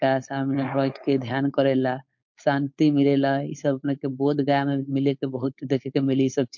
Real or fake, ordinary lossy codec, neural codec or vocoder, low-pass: fake; MP3, 48 kbps; codec, 16 kHz, 4.8 kbps, FACodec; 7.2 kHz